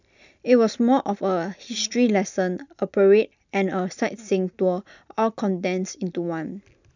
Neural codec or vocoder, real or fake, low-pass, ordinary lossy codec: none; real; 7.2 kHz; none